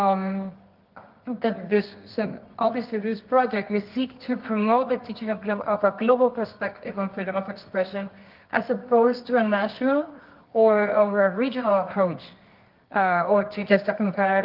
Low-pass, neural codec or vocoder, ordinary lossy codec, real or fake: 5.4 kHz; codec, 24 kHz, 0.9 kbps, WavTokenizer, medium music audio release; Opus, 32 kbps; fake